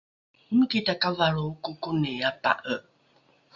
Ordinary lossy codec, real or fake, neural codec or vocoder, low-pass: Opus, 64 kbps; real; none; 7.2 kHz